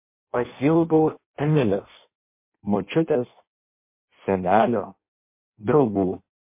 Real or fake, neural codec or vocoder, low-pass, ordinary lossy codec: fake; codec, 16 kHz in and 24 kHz out, 0.6 kbps, FireRedTTS-2 codec; 3.6 kHz; MP3, 24 kbps